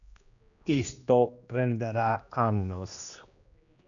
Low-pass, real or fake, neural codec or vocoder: 7.2 kHz; fake; codec, 16 kHz, 1 kbps, X-Codec, HuBERT features, trained on general audio